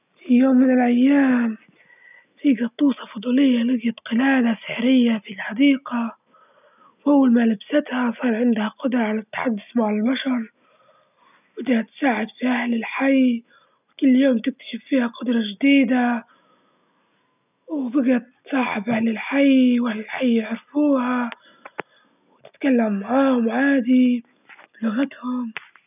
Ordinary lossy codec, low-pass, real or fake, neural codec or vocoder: none; 3.6 kHz; real; none